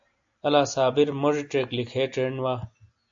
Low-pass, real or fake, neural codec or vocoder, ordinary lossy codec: 7.2 kHz; real; none; AAC, 64 kbps